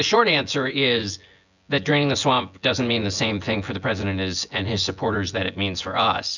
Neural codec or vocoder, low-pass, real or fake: vocoder, 24 kHz, 100 mel bands, Vocos; 7.2 kHz; fake